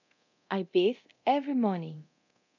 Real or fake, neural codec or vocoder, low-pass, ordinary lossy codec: fake; codec, 24 kHz, 0.9 kbps, DualCodec; 7.2 kHz; none